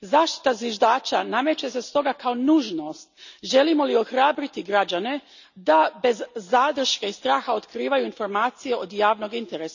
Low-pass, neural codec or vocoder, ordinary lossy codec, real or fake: 7.2 kHz; none; none; real